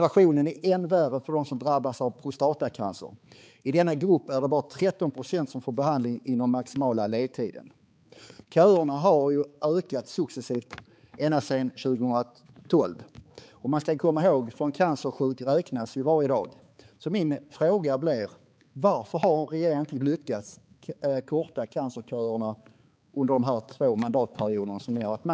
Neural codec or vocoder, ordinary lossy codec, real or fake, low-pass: codec, 16 kHz, 4 kbps, X-Codec, HuBERT features, trained on balanced general audio; none; fake; none